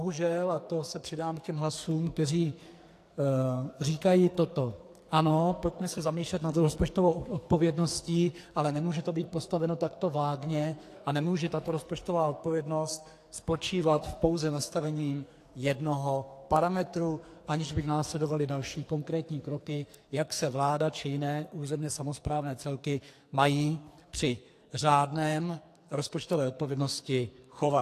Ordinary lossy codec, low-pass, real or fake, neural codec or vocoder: AAC, 64 kbps; 14.4 kHz; fake; codec, 44.1 kHz, 2.6 kbps, SNAC